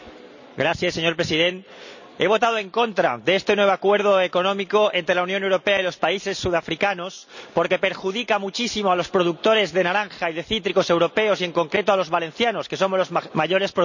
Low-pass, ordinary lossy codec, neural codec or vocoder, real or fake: 7.2 kHz; none; none; real